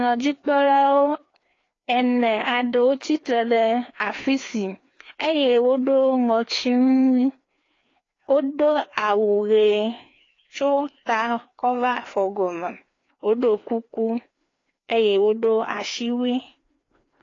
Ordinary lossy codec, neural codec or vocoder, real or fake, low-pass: AAC, 32 kbps; codec, 16 kHz, 2 kbps, FreqCodec, larger model; fake; 7.2 kHz